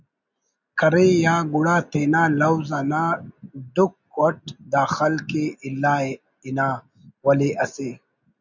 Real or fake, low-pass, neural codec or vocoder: real; 7.2 kHz; none